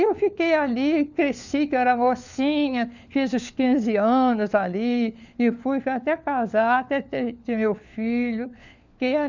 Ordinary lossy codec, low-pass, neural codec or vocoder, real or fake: none; 7.2 kHz; codec, 16 kHz, 4 kbps, FunCodec, trained on LibriTTS, 50 frames a second; fake